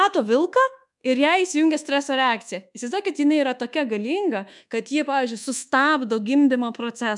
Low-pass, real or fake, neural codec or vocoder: 10.8 kHz; fake; codec, 24 kHz, 1.2 kbps, DualCodec